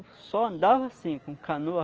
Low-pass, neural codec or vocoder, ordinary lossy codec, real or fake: 7.2 kHz; none; Opus, 24 kbps; real